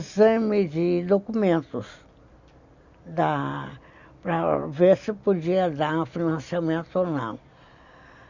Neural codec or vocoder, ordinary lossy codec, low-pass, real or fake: none; Opus, 64 kbps; 7.2 kHz; real